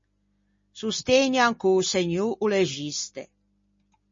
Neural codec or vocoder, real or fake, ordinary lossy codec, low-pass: none; real; MP3, 32 kbps; 7.2 kHz